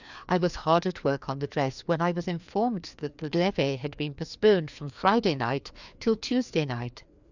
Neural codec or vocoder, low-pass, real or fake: codec, 16 kHz, 2 kbps, FreqCodec, larger model; 7.2 kHz; fake